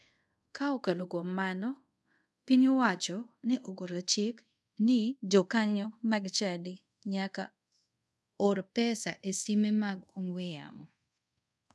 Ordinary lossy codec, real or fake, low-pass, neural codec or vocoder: none; fake; none; codec, 24 kHz, 0.5 kbps, DualCodec